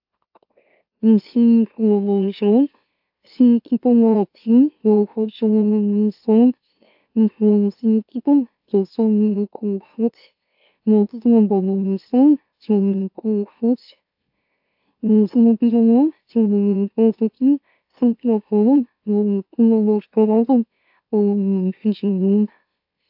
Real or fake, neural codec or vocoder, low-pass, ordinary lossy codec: fake; autoencoder, 44.1 kHz, a latent of 192 numbers a frame, MeloTTS; 5.4 kHz; AAC, 48 kbps